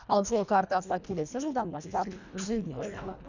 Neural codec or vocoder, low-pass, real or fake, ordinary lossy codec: codec, 24 kHz, 1.5 kbps, HILCodec; 7.2 kHz; fake; none